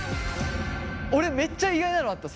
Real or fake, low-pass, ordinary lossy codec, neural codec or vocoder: real; none; none; none